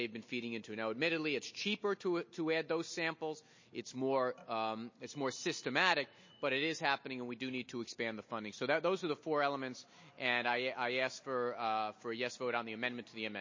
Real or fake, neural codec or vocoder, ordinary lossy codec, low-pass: real; none; MP3, 32 kbps; 7.2 kHz